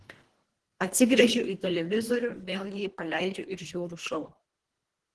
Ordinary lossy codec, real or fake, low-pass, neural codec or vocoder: Opus, 16 kbps; fake; 10.8 kHz; codec, 24 kHz, 1.5 kbps, HILCodec